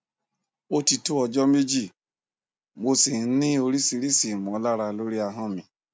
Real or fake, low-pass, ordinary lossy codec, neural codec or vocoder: real; none; none; none